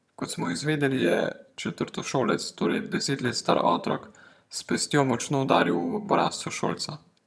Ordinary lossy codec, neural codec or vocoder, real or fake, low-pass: none; vocoder, 22.05 kHz, 80 mel bands, HiFi-GAN; fake; none